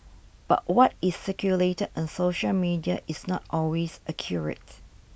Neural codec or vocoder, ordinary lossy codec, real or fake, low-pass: none; none; real; none